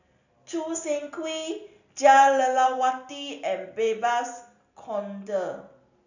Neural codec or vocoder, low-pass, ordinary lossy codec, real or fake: none; 7.2 kHz; none; real